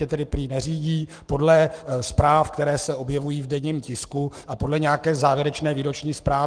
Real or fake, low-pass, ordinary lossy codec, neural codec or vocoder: fake; 9.9 kHz; Opus, 24 kbps; codec, 44.1 kHz, 7.8 kbps, Pupu-Codec